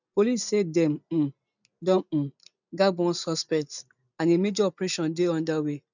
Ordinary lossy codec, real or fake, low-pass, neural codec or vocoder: none; real; 7.2 kHz; none